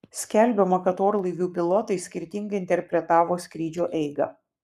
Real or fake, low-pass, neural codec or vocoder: fake; 14.4 kHz; codec, 44.1 kHz, 7.8 kbps, Pupu-Codec